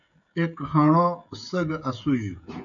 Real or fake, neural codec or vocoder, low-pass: fake; codec, 16 kHz, 16 kbps, FreqCodec, smaller model; 7.2 kHz